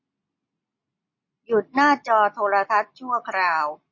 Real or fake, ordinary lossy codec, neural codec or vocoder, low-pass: real; MP3, 32 kbps; none; 7.2 kHz